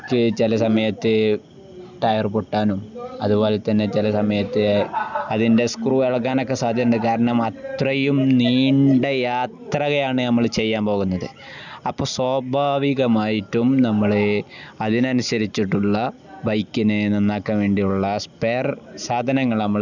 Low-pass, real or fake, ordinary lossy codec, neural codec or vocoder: 7.2 kHz; real; none; none